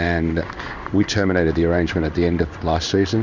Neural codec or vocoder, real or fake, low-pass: codec, 16 kHz in and 24 kHz out, 1 kbps, XY-Tokenizer; fake; 7.2 kHz